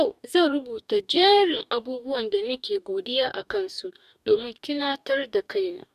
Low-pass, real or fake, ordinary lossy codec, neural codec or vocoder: 14.4 kHz; fake; none; codec, 44.1 kHz, 2.6 kbps, DAC